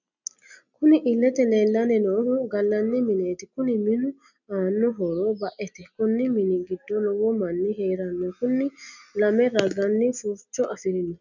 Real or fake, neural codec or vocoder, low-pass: real; none; 7.2 kHz